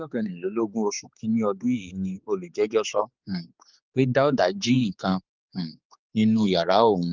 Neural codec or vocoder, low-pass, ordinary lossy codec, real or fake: codec, 16 kHz, 4 kbps, X-Codec, HuBERT features, trained on general audio; 7.2 kHz; Opus, 24 kbps; fake